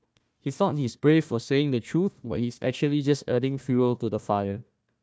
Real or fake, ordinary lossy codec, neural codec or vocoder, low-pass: fake; none; codec, 16 kHz, 1 kbps, FunCodec, trained on Chinese and English, 50 frames a second; none